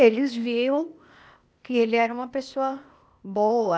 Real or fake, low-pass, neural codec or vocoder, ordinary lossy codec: fake; none; codec, 16 kHz, 0.8 kbps, ZipCodec; none